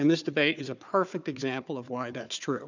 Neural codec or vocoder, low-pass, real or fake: codec, 16 kHz, 4 kbps, FunCodec, trained on Chinese and English, 50 frames a second; 7.2 kHz; fake